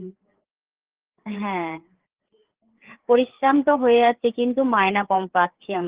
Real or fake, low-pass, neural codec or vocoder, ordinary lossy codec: fake; 3.6 kHz; codec, 16 kHz, 4 kbps, FreqCodec, larger model; Opus, 16 kbps